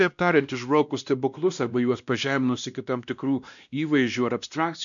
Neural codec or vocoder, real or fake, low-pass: codec, 16 kHz, 1 kbps, X-Codec, WavLM features, trained on Multilingual LibriSpeech; fake; 7.2 kHz